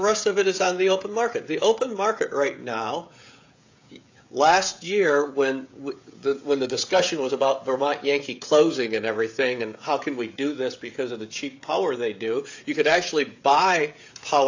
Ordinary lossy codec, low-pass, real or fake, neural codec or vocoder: AAC, 48 kbps; 7.2 kHz; fake; codec, 16 kHz, 16 kbps, FreqCodec, smaller model